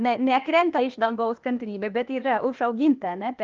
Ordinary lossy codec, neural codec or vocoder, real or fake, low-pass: Opus, 24 kbps; codec, 16 kHz, 0.8 kbps, ZipCodec; fake; 7.2 kHz